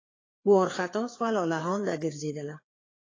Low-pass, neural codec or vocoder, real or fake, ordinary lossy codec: 7.2 kHz; codec, 16 kHz, 4 kbps, FreqCodec, larger model; fake; AAC, 32 kbps